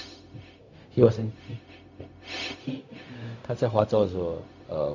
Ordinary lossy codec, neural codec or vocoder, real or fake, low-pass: none; codec, 16 kHz, 0.4 kbps, LongCat-Audio-Codec; fake; 7.2 kHz